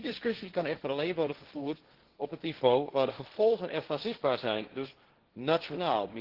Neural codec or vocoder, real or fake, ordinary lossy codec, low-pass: codec, 16 kHz, 1.1 kbps, Voila-Tokenizer; fake; Opus, 16 kbps; 5.4 kHz